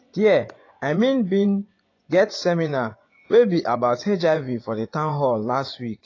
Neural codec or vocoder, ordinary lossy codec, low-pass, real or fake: vocoder, 24 kHz, 100 mel bands, Vocos; AAC, 32 kbps; 7.2 kHz; fake